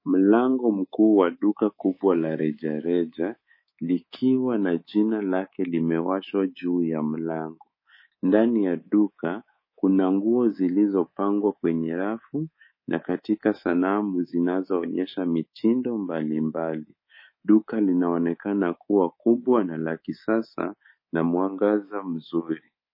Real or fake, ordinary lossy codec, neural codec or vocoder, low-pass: fake; MP3, 24 kbps; codec, 24 kHz, 3.1 kbps, DualCodec; 5.4 kHz